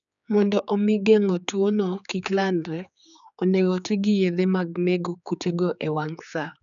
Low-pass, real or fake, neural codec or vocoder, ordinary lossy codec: 7.2 kHz; fake; codec, 16 kHz, 4 kbps, X-Codec, HuBERT features, trained on general audio; none